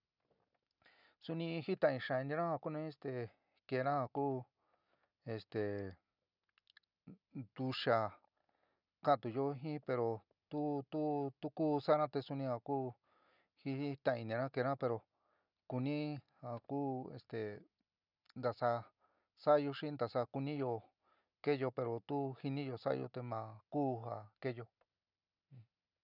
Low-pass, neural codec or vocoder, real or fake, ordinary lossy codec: 5.4 kHz; none; real; none